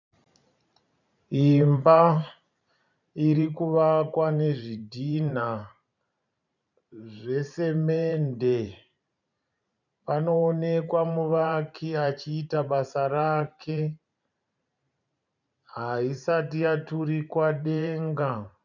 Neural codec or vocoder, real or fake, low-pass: vocoder, 44.1 kHz, 128 mel bands every 512 samples, BigVGAN v2; fake; 7.2 kHz